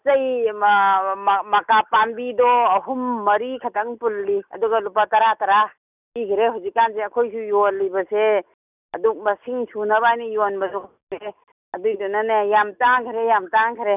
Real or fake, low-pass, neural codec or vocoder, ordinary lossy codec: real; 3.6 kHz; none; none